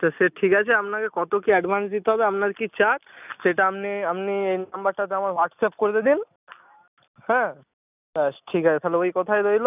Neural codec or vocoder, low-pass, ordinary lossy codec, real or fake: none; 3.6 kHz; none; real